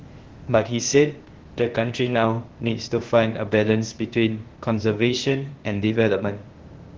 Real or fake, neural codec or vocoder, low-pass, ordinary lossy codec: fake; codec, 16 kHz, 0.8 kbps, ZipCodec; 7.2 kHz; Opus, 16 kbps